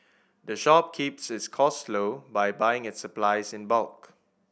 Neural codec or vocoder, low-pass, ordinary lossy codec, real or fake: none; none; none; real